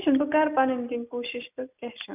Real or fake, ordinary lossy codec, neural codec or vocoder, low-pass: real; none; none; 3.6 kHz